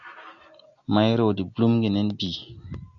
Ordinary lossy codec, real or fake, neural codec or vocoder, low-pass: MP3, 64 kbps; real; none; 7.2 kHz